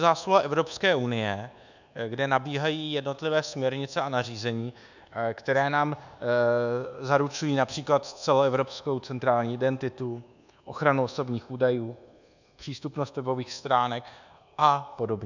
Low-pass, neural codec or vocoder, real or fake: 7.2 kHz; codec, 24 kHz, 1.2 kbps, DualCodec; fake